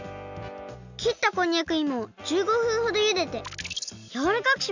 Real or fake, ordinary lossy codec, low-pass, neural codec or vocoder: real; none; 7.2 kHz; none